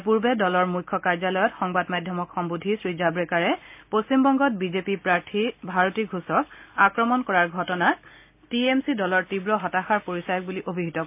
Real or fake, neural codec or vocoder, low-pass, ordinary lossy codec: real; none; 3.6 kHz; MP3, 32 kbps